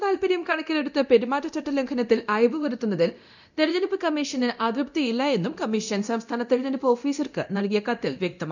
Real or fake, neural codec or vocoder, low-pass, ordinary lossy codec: fake; codec, 24 kHz, 0.9 kbps, DualCodec; 7.2 kHz; none